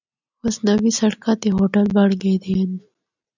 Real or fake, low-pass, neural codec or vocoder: real; 7.2 kHz; none